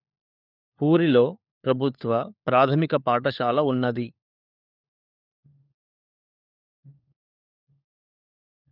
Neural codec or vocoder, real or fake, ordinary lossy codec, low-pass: codec, 16 kHz, 4 kbps, FunCodec, trained on LibriTTS, 50 frames a second; fake; none; 5.4 kHz